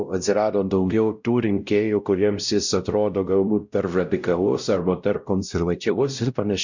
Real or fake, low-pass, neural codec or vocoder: fake; 7.2 kHz; codec, 16 kHz, 0.5 kbps, X-Codec, WavLM features, trained on Multilingual LibriSpeech